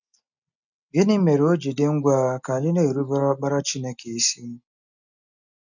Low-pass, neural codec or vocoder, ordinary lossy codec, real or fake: 7.2 kHz; none; none; real